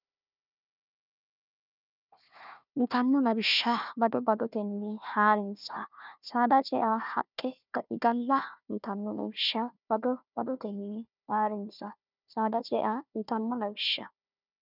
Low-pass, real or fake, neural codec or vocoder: 5.4 kHz; fake; codec, 16 kHz, 1 kbps, FunCodec, trained on Chinese and English, 50 frames a second